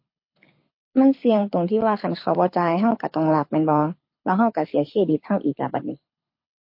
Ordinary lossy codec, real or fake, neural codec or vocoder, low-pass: MP3, 32 kbps; fake; codec, 24 kHz, 6 kbps, HILCodec; 5.4 kHz